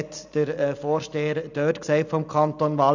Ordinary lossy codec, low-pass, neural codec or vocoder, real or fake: none; 7.2 kHz; none; real